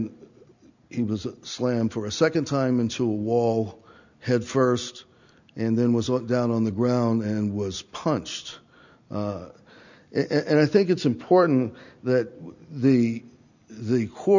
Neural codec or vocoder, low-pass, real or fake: none; 7.2 kHz; real